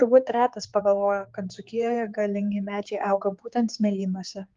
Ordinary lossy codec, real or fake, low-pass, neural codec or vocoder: Opus, 32 kbps; fake; 7.2 kHz; codec, 16 kHz, 4 kbps, X-Codec, HuBERT features, trained on general audio